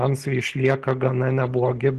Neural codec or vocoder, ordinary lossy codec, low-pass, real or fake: none; Opus, 16 kbps; 14.4 kHz; real